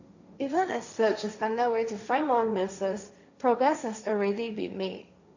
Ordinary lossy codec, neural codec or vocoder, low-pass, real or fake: none; codec, 16 kHz, 1.1 kbps, Voila-Tokenizer; 7.2 kHz; fake